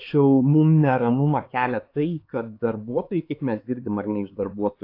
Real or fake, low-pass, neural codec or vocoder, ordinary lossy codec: fake; 5.4 kHz; codec, 16 kHz, 4 kbps, X-Codec, HuBERT features, trained on LibriSpeech; AAC, 32 kbps